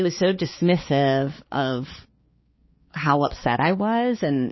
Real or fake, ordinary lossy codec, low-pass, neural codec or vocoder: fake; MP3, 24 kbps; 7.2 kHz; codec, 16 kHz, 4 kbps, X-Codec, HuBERT features, trained on balanced general audio